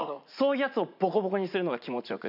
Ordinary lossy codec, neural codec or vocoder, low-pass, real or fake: none; vocoder, 44.1 kHz, 80 mel bands, Vocos; 5.4 kHz; fake